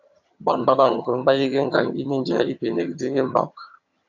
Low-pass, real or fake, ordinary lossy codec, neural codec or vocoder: 7.2 kHz; fake; AAC, 48 kbps; vocoder, 22.05 kHz, 80 mel bands, HiFi-GAN